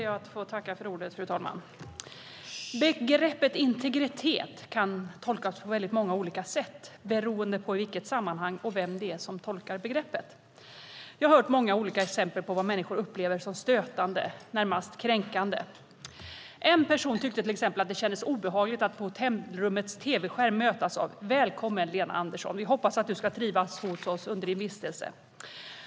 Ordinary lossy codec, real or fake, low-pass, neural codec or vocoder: none; real; none; none